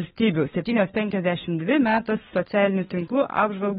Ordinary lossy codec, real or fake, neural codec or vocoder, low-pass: AAC, 16 kbps; fake; codec, 16 kHz, 4 kbps, FunCodec, trained on LibriTTS, 50 frames a second; 7.2 kHz